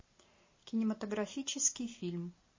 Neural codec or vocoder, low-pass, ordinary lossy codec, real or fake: none; 7.2 kHz; MP3, 32 kbps; real